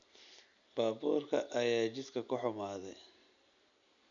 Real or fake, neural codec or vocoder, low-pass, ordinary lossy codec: real; none; 7.2 kHz; none